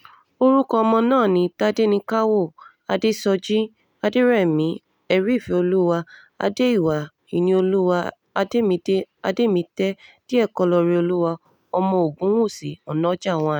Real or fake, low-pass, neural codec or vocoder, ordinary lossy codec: real; 19.8 kHz; none; none